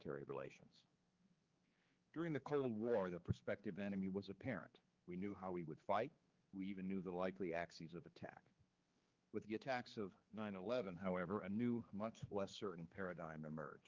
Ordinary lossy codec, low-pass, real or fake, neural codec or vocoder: Opus, 32 kbps; 7.2 kHz; fake; codec, 16 kHz, 4 kbps, X-Codec, HuBERT features, trained on general audio